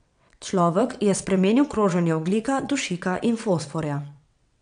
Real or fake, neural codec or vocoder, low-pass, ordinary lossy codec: fake; vocoder, 22.05 kHz, 80 mel bands, WaveNeXt; 9.9 kHz; none